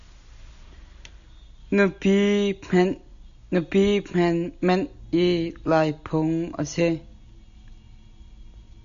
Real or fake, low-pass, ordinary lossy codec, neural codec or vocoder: real; 7.2 kHz; AAC, 96 kbps; none